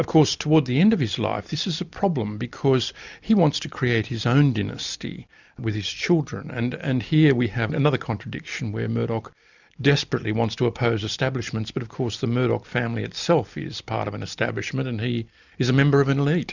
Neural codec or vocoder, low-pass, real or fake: none; 7.2 kHz; real